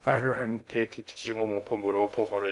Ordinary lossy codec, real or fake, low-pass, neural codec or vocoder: MP3, 96 kbps; fake; 10.8 kHz; codec, 16 kHz in and 24 kHz out, 0.6 kbps, FocalCodec, streaming, 2048 codes